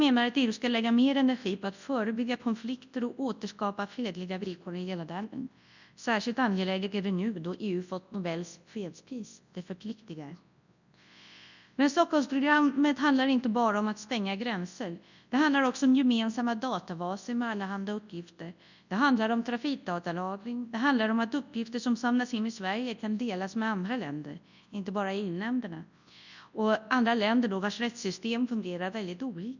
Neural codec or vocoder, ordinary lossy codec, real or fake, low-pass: codec, 24 kHz, 0.9 kbps, WavTokenizer, large speech release; none; fake; 7.2 kHz